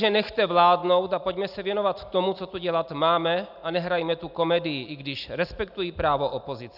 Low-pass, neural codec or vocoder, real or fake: 5.4 kHz; none; real